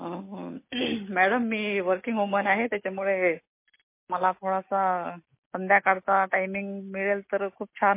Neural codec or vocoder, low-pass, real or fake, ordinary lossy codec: none; 3.6 kHz; real; MP3, 24 kbps